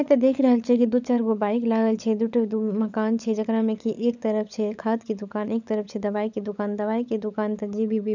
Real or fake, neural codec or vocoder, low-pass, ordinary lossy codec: fake; codec, 16 kHz, 16 kbps, FunCodec, trained on LibriTTS, 50 frames a second; 7.2 kHz; none